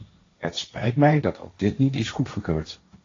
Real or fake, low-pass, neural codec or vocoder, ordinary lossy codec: fake; 7.2 kHz; codec, 16 kHz, 1.1 kbps, Voila-Tokenizer; AAC, 32 kbps